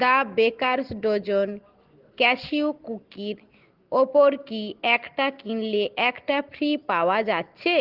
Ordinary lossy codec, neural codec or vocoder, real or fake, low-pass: Opus, 16 kbps; none; real; 5.4 kHz